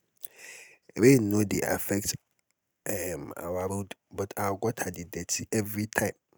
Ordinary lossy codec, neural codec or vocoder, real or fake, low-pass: none; none; real; none